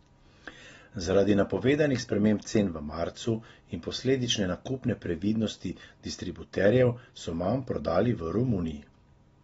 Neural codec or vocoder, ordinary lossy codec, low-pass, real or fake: none; AAC, 24 kbps; 19.8 kHz; real